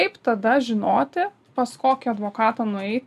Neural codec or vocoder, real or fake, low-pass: none; real; 14.4 kHz